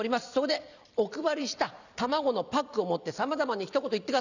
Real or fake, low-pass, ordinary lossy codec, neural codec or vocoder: fake; 7.2 kHz; none; vocoder, 44.1 kHz, 128 mel bands every 256 samples, BigVGAN v2